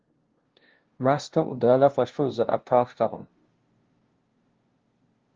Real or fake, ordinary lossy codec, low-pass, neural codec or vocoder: fake; Opus, 16 kbps; 7.2 kHz; codec, 16 kHz, 0.5 kbps, FunCodec, trained on LibriTTS, 25 frames a second